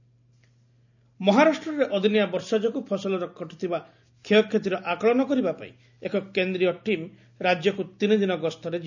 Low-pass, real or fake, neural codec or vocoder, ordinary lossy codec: 7.2 kHz; real; none; none